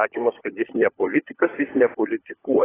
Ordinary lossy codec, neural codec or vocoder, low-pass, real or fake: AAC, 16 kbps; codec, 16 kHz, 16 kbps, FunCodec, trained on LibriTTS, 50 frames a second; 3.6 kHz; fake